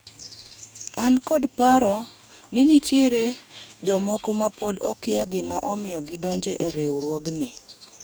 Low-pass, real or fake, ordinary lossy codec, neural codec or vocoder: none; fake; none; codec, 44.1 kHz, 2.6 kbps, DAC